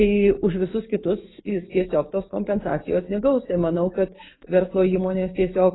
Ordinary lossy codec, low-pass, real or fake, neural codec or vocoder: AAC, 16 kbps; 7.2 kHz; fake; codec, 16 kHz, 6 kbps, DAC